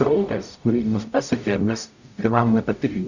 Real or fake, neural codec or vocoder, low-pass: fake; codec, 44.1 kHz, 0.9 kbps, DAC; 7.2 kHz